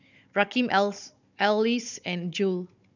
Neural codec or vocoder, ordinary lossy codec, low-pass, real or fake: codec, 16 kHz, 16 kbps, FunCodec, trained on Chinese and English, 50 frames a second; none; 7.2 kHz; fake